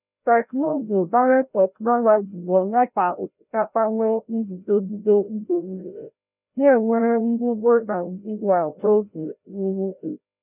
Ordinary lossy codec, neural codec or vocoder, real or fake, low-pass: none; codec, 16 kHz, 0.5 kbps, FreqCodec, larger model; fake; 3.6 kHz